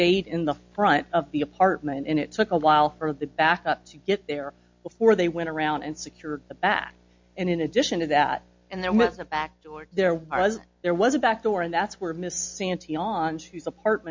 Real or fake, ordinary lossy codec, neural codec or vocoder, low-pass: real; MP3, 48 kbps; none; 7.2 kHz